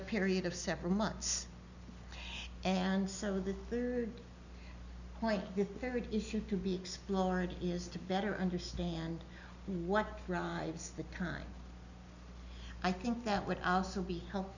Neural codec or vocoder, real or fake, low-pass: none; real; 7.2 kHz